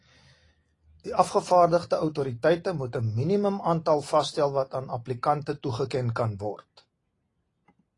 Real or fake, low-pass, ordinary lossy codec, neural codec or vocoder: real; 10.8 kHz; AAC, 32 kbps; none